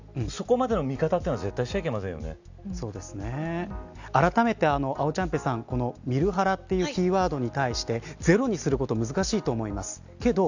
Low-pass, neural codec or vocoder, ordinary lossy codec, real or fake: 7.2 kHz; none; none; real